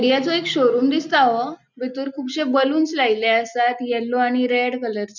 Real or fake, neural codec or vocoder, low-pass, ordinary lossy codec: real; none; 7.2 kHz; none